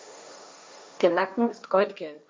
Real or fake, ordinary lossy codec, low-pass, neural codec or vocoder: fake; none; none; codec, 16 kHz, 1.1 kbps, Voila-Tokenizer